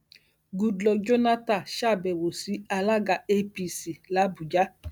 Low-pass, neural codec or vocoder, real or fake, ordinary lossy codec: none; none; real; none